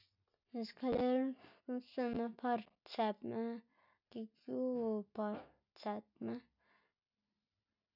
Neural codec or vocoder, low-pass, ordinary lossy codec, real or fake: none; 5.4 kHz; none; real